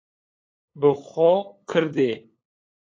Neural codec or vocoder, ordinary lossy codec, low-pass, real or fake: codec, 16 kHz, 8 kbps, FunCodec, trained on LibriTTS, 25 frames a second; AAC, 32 kbps; 7.2 kHz; fake